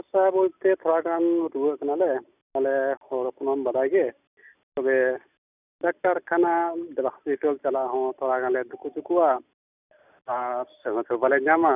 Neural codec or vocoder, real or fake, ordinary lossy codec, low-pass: none; real; none; 3.6 kHz